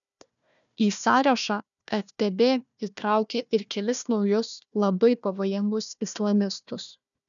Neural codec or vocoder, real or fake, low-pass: codec, 16 kHz, 1 kbps, FunCodec, trained on Chinese and English, 50 frames a second; fake; 7.2 kHz